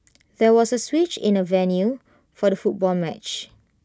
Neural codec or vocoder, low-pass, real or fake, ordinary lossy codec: none; none; real; none